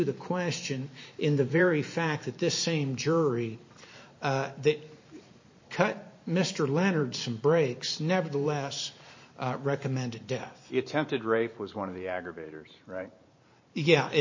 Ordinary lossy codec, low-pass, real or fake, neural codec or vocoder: MP3, 32 kbps; 7.2 kHz; fake; vocoder, 44.1 kHz, 128 mel bands every 512 samples, BigVGAN v2